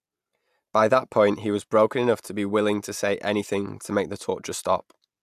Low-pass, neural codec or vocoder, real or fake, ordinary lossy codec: 14.4 kHz; none; real; AAC, 96 kbps